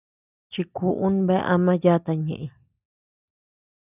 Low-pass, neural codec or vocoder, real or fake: 3.6 kHz; none; real